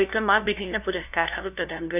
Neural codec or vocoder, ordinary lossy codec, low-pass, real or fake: codec, 16 kHz, 0.5 kbps, FunCodec, trained on LibriTTS, 25 frames a second; none; 3.6 kHz; fake